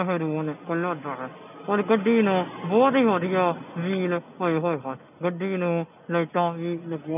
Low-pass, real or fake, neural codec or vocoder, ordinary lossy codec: 3.6 kHz; real; none; none